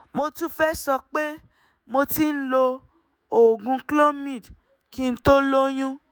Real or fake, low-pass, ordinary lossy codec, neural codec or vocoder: fake; none; none; autoencoder, 48 kHz, 128 numbers a frame, DAC-VAE, trained on Japanese speech